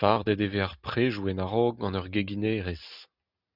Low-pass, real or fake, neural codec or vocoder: 5.4 kHz; real; none